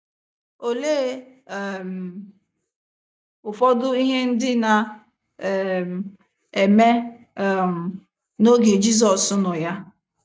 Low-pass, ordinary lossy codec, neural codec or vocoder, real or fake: none; none; none; real